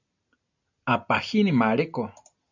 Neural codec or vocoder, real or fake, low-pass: none; real; 7.2 kHz